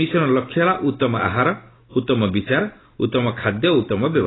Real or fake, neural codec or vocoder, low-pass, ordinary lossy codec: real; none; 7.2 kHz; AAC, 16 kbps